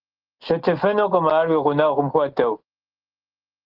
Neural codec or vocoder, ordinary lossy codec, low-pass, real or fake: none; Opus, 16 kbps; 5.4 kHz; real